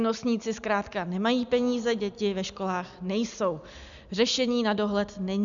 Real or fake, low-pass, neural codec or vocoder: real; 7.2 kHz; none